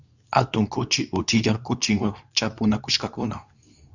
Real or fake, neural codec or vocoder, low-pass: fake; codec, 24 kHz, 0.9 kbps, WavTokenizer, medium speech release version 2; 7.2 kHz